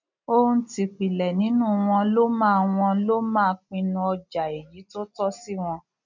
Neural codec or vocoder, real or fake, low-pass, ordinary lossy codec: none; real; 7.2 kHz; none